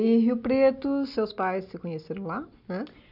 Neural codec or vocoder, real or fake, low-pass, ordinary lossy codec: none; real; 5.4 kHz; none